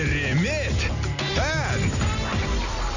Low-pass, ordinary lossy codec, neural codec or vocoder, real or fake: 7.2 kHz; none; none; real